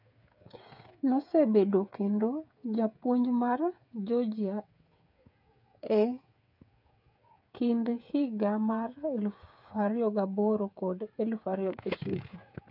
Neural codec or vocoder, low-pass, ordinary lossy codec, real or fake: codec, 16 kHz, 8 kbps, FreqCodec, smaller model; 5.4 kHz; none; fake